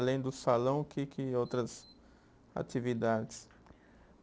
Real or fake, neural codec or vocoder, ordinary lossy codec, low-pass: real; none; none; none